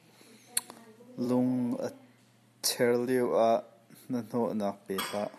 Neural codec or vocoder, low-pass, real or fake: vocoder, 44.1 kHz, 128 mel bands every 256 samples, BigVGAN v2; 14.4 kHz; fake